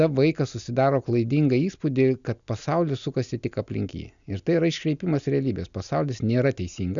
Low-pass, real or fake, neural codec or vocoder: 7.2 kHz; real; none